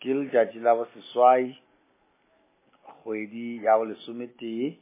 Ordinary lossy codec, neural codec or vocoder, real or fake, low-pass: MP3, 16 kbps; none; real; 3.6 kHz